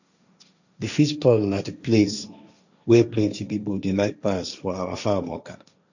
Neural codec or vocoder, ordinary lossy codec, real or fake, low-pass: codec, 16 kHz, 1.1 kbps, Voila-Tokenizer; none; fake; 7.2 kHz